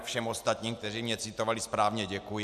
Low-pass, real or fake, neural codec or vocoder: 14.4 kHz; real; none